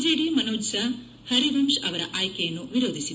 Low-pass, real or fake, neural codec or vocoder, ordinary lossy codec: none; real; none; none